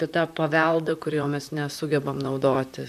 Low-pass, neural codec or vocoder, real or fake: 14.4 kHz; vocoder, 44.1 kHz, 128 mel bands, Pupu-Vocoder; fake